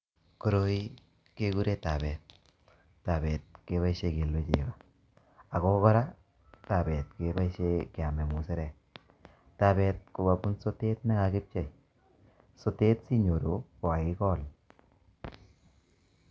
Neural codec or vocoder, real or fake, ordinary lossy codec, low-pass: none; real; none; none